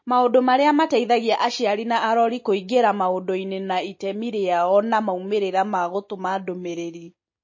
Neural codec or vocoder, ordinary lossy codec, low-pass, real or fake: none; MP3, 32 kbps; 7.2 kHz; real